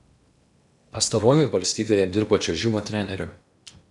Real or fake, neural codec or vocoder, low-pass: fake; codec, 16 kHz in and 24 kHz out, 0.8 kbps, FocalCodec, streaming, 65536 codes; 10.8 kHz